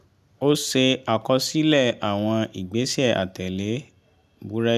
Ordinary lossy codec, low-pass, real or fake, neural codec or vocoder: none; 14.4 kHz; real; none